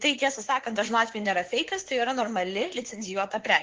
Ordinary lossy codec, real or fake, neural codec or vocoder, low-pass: Opus, 16 kbps; fake; codec, 16 kHz, 4 kbps, X-Codec, WavLM features, trained on Multilingual LibriSpeech; 7.2 kHz